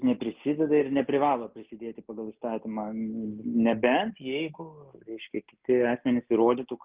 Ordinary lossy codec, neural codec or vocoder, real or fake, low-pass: Opus, 24 kbps; none; real; 3.6 kHz